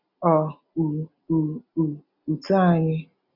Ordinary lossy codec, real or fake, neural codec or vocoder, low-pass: none; real; none; 5.4 kHz